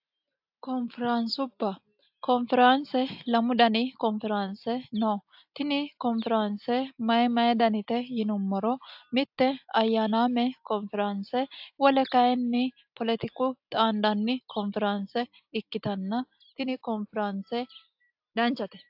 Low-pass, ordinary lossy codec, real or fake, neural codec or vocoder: 5.4 kHz; AAC, 48 kbps; real; none